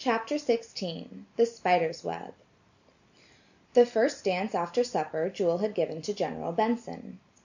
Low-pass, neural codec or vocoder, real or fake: 7.2 kHz; none; real